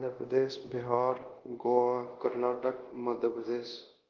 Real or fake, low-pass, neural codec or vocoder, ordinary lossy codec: fake; 7.2 kHz; codec, 24 kHz, 0.5 kbps, DualCodec; Opus, 16 kbps